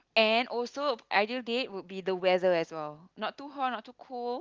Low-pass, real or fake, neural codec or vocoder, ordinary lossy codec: 7.2 kHz; real; none; Opus, 32 kbps